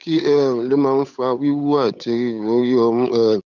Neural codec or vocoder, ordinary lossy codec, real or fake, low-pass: codec, 16 kHz, 8 kbps, FunCodec, trained on Chinese and English, 25 frames a second; none; fake; 7.2 kHz